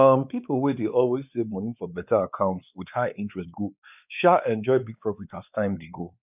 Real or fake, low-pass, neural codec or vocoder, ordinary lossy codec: fake; 3.6 kHz; codec, 16 kHz, 4 kbps, X-Codec, WavLM features, trained on Multilingual LibriSpeech; none